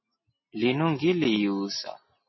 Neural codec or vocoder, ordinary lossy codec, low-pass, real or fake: none; MP3, 24 kbps; 7.2 kHz; real